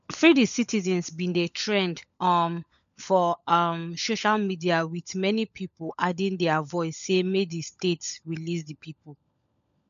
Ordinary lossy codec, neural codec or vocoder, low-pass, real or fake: none; codec, 16 kHz, 16 kbps, FunCodec, trained on LibriTTS, 50 frames a second; 7.2 kHz; fake